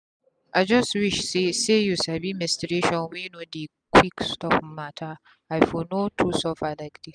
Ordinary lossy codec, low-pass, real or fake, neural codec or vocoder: none; 9.9 kHz; real; none